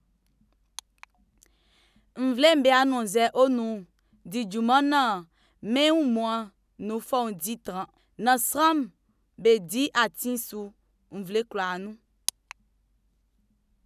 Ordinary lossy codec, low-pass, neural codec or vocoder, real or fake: none; 14.4 kHz; none; real